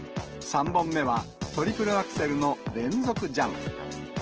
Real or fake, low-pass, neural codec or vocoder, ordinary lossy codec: real; 7.2 kHz; none; Opus, 16 kbps